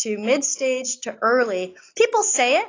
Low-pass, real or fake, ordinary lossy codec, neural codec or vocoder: 7.2 kHz; real; AAC, 32 kbps; none